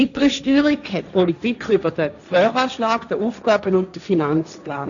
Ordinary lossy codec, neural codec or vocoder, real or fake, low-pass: none; codec, 16 kHz, 1.1 kbps, Voila-Tokenizer; fake; 7.2 kHz